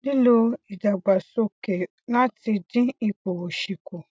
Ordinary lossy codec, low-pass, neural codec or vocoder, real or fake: none; none; none; real